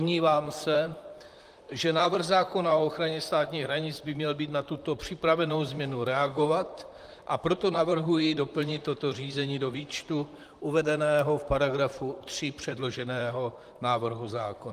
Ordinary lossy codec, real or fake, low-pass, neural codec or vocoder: Opus, 32 kbps; fake; 14.4 kHz; vocoder, 44.1 kHz, 128 mel bands, Pupu-Vocoder